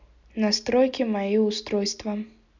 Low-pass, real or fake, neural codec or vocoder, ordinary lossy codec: 7.2 kHz; real; none; none